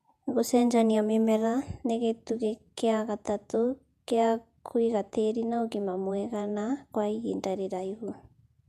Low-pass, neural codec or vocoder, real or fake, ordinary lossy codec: 14.4 kHz; vocoder, 48 kHz, 128 mel bands, Vocos; fake; none